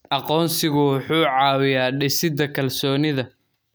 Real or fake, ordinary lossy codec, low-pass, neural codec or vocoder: real; none; none; none